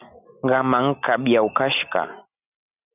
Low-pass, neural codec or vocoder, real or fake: 3.6 kHz; none; real